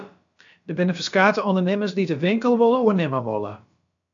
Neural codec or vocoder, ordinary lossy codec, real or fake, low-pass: codec, 16 kHz, about 1 kbps, DyCAST, with the encoder's durations; AAC, 64 kbps; fake; 7.2 kHz